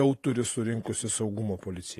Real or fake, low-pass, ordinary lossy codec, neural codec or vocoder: fake; 14.4 kHz; AAC, 48 kbps; vocoder, 48 kHz, 128 mel bands, Vocos